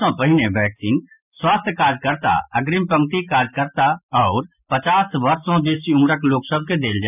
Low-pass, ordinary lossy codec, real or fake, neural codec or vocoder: 3.6 kHz; none; real; none